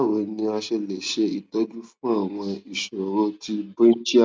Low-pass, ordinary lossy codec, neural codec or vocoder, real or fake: none; none; none; real